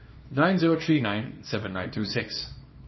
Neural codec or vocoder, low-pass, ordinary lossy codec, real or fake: codec, 24 kHz, 0.9 kbps, WavTokenizer, small release; 7.2 kHz; MP3, 24 kbps; fake